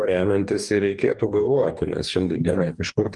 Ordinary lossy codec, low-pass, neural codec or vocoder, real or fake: Opus, 32 kbps; 10.8 kHz; codec, 44.1 kHz, 2.6 kbps, DAC; fake